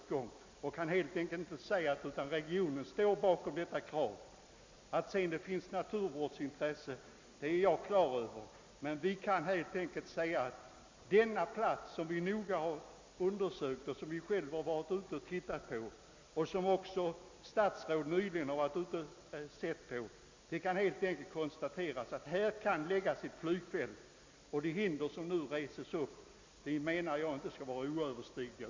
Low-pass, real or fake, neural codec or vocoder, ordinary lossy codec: 7.2 kHz; real; none; MP3, 64 kbps